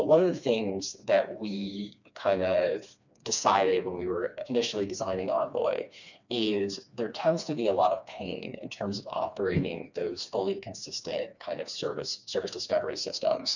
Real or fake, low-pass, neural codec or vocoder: fake; 7.2 kHz; codec, 16 kHz, 2 kbps, FreqCodec, smaller model